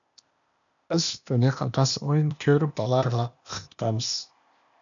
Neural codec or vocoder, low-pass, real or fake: codec, 16 kHz, 0.8 kbps, ZipCodec; 7.2 kHz; fake